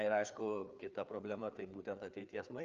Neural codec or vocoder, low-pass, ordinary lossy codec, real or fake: codec, 16 kHz, 4 kbps, FreqCodec, larger model; 7.2 kHz; Opus, 24 kbps; fake